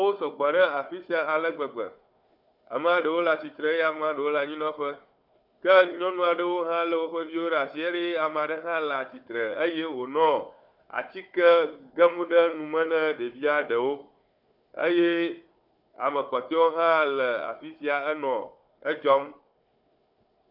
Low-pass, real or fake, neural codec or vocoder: 5.4 kHz; fake; codec, 16 kHz, 4 kbps, FunCodec, trained on Chinese and English, 50 frames a second